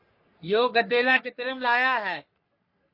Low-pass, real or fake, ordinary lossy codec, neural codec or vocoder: 5.4 kHz; fake; MP3, 32 kbps; codec, 44.1 kHz, 3.4 kbps, Pupu-Codec